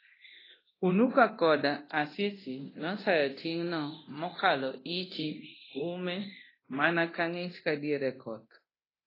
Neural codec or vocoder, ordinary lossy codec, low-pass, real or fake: codec, 24 kHz, 0.9 kbps, DualCodec; AAC, 24 kbps; 5.4 kHz; fake